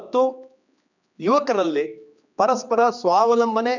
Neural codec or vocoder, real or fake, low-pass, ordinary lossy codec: codec, 16 kHz, 2 kbps, X-Codec, HuBERT features, trained on general audio; fake; 7.2 kHz; none